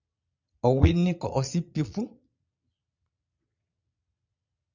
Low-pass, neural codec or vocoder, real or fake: 7.2 kHz; vocoder, 22.05 kHz, 80 mel bands, Vocos; fake